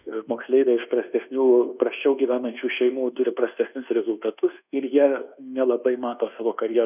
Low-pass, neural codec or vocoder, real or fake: 3.6 kHz; codec, 24 kHz, 1.2 kbps, DualCodec; fake